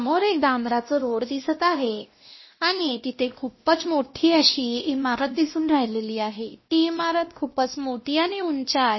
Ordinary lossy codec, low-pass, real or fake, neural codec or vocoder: MP3, 24 kbps; 7.2 kHz; fake; codec, 16 kHz, 1 kbps, X-Codec, WavLM features, trained on Multilingual LibriSpeech